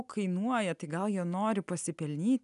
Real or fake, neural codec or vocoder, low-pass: real; none; 10.8 kHz